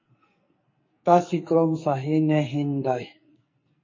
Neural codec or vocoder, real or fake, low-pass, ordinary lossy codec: codec, 44.1 kHz, 2.6 kbps, SNAC; fake; 7.2 kHz; MP3, 32 kbps